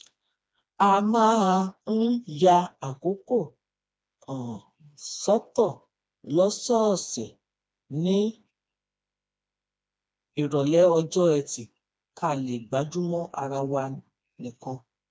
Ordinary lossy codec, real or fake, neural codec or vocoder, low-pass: none; fake; codec, 16 kHz, 2 kbps, FreqCodec, smaller model; none